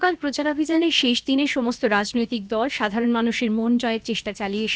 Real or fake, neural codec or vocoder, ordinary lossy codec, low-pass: fake; codec, 16 kHz, about 1 kbps, DyCAST, with the encoder's durations; none; none